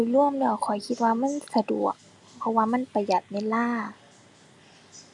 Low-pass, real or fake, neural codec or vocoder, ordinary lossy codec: 10.8 kHz; real; none; none